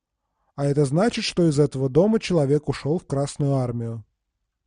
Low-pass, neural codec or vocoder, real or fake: 9.9 kHz; none; real